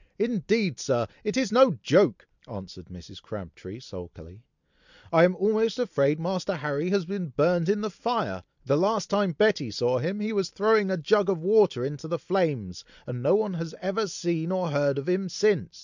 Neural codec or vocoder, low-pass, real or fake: none; 7.2 kHz; real